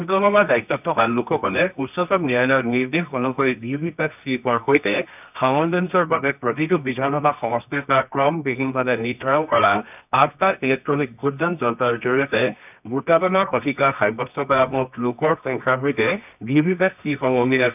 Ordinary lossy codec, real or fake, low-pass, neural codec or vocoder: none; fake; 3.6 kHz; codec, 24 kHz, 0.9 kbps, WavTokenizer, medium music audio release